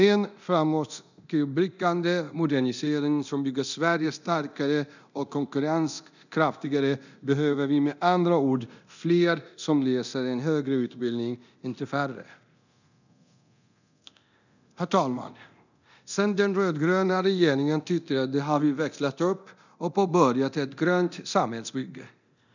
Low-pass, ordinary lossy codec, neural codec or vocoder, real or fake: 7.2 kHz; none; codec, 24 kHz, 0.9 kbps, DualCodec; fake